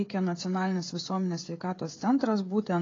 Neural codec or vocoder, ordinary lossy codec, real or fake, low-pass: codec, 16 kHz, 16 kbps, FreqCodec, smaller model; AAC, 32 kbps; fake; 7.2 kHz